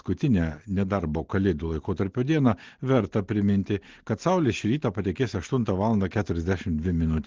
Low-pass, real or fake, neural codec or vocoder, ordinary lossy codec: 7.2 kHz; real; none; Opus, 16 kbps